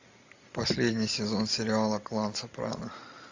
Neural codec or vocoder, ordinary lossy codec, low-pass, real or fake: none; AAC, 48 kbps; 7.2 kHz; real